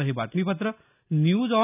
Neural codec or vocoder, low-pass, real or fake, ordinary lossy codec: none; 3.6 kHz; real; none